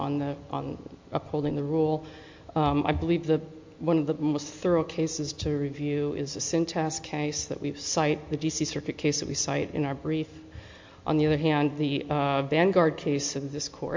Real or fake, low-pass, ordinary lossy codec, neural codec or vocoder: real; 7.2 kHz; MP3, 48 kbps; none